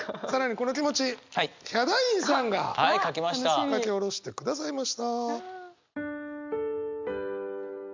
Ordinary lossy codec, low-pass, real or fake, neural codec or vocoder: MP3, 64 kbps; 7.2 kHz; real; none